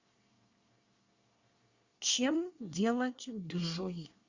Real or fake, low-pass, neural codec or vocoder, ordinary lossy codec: fake; 7.2 kHz; codec, 24 kHz, 1 kbps, SNAC; Opus, 64 kbps